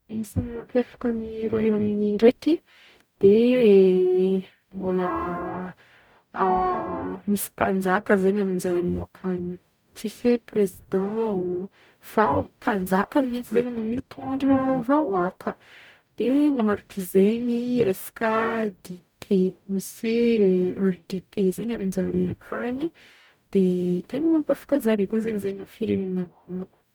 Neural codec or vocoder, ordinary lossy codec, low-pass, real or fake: codec, 44.1 kHz, 0.9 kbps, DAC; none; none; fake